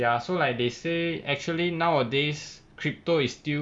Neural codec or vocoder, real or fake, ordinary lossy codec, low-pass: none; real; none; 9.9 kHz